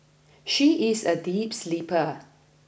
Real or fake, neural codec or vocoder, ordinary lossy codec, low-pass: real; none; none; none